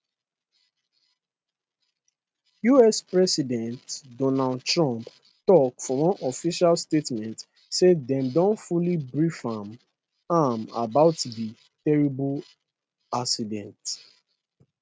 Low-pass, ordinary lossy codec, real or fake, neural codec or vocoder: none; none; real; none